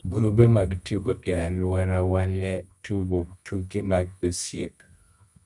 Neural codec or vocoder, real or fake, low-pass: codec, 24 kHz, 0.9 kbps, WavTokenizer, medium music audio release; fake; 10.8 kHz